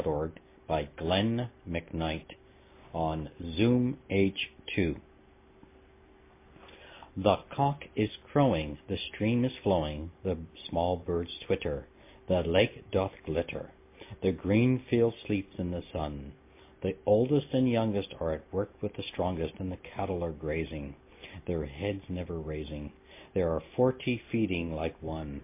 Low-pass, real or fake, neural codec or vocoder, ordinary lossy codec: 3.6 kHz; real; none; MP3, 24 kbps